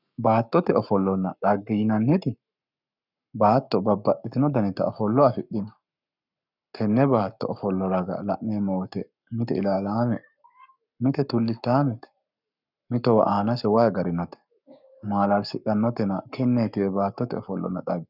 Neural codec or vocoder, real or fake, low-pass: codec, 44.1 kHz, 7.8 kbps, Pupu-Codec; fake; 5.4 kHz